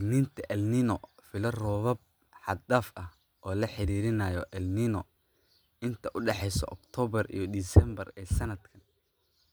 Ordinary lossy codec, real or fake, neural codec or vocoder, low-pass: none; real; none; none